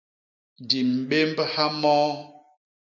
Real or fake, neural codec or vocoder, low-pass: real; none; 7.2 kHz